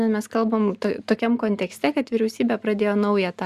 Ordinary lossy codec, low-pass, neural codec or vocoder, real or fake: Opus, 64 kbps; 14.4 kHz; none; real